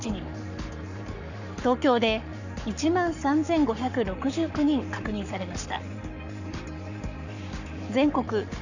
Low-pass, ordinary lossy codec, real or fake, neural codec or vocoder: 7.2 kHz; none; fake; codec, 44.1 kHz, 7.8 kbps, Pupu-Codec